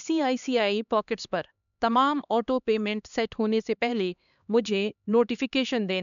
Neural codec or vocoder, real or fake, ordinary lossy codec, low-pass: codec, 16 kHz, 2 kbps, X-Codec, HuBERT features, trained on LibriSpeech; fake; none; 7.2 kHz